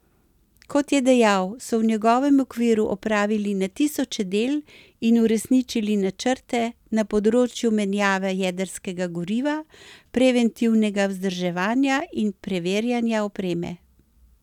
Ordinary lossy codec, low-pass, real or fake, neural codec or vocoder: none; 19.8 kHz; real; none